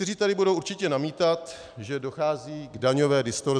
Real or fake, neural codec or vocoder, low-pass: real; none; 9.9 kHz